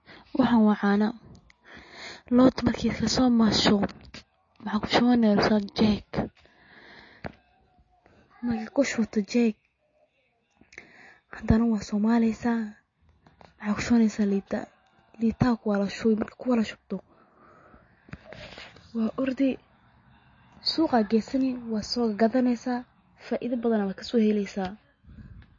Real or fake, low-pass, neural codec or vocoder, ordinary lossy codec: real; 7.2 kHz; none; MP3, 32 kbps